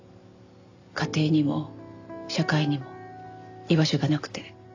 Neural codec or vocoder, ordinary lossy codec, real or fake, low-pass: none; AAC, 48 kbps; real; 7.2 kHz